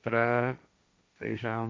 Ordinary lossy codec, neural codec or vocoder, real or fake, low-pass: none; codec, 16 kHz, 1.1 kbps, Voila-Tokenizer; fake; none